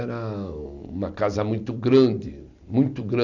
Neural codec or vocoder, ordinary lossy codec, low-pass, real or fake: none; Opus, 64 kbps; 7.2 kHz; real